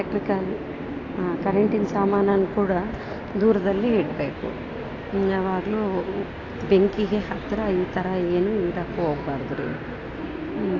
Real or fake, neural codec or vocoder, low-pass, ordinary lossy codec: real; none; 7.2 kHz; AAC, 32 kbps